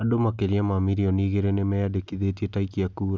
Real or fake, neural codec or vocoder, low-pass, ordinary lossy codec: real; none; none; none